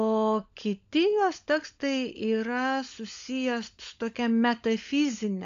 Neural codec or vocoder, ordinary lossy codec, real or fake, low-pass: codec, 16 kHz, 16 kbps, FunCodec, trained on LibriTTS, 50 frames a second; AAC, 64 kbps; fake; 7.2 kHz